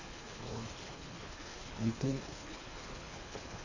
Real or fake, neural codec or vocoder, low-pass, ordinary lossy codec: fake; codec, 24 kHz, 3 kbps, HILCodec; 7.2 kHz; Opus, 64 kbps